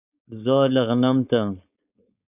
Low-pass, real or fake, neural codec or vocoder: 3.6 kHz; fake; codec, 16 kHz, 4.8 kbps, FACodec